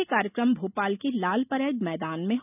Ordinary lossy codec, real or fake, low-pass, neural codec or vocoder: none; real; 3.6 kHz; none